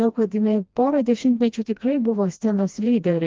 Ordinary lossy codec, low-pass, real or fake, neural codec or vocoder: Opus, 24 kbps; 7.2 kHz; fake; codec, 16 kHz, 1 kbps, FreqCodec, smaller model